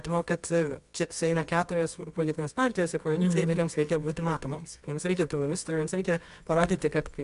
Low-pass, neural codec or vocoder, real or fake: 10.8 kHz; codec, 24 kHz, 0.9 kbps, WavTokenizer, medium music audio release; fake